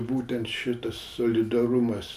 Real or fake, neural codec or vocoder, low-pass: real; none; 14.4 kHz